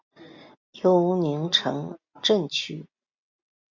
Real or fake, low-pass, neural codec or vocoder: real; 7.2 kHz; none